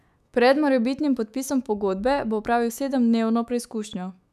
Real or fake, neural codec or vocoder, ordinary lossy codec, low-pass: fake; autoencoder, 48 kHz, 128 numbers a frame, DAC-VAE, trained on Japanese speech; none; 14.4 kHz